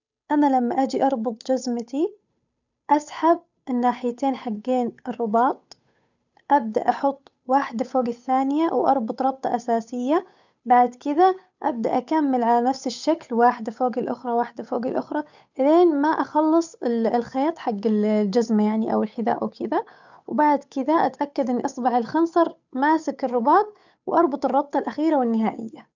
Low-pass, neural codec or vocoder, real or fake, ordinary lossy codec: 7.2 kHz; codec, 16 kHz, 8 kbps, FunCodec, trained on Chinese and English, 25 frames a second; fake; none